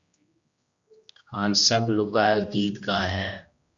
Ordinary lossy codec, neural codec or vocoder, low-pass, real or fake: Opus, 64 kbps; codec, 16 kHz, 1 kbps, X-Codec, HuBERT features, trained on general audio; 7.2 kHz; fake